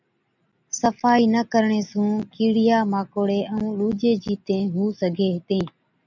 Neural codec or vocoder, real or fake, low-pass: none; real; 7.2 kHz